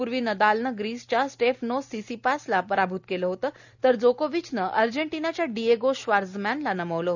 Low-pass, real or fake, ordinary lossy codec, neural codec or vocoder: 7.2 kHz; real; none; none